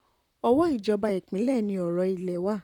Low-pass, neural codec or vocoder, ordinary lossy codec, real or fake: 19.8 kHz; vocoder, 44.1 kHz, 128 mel bands, Pupu-Vocoder; none; fake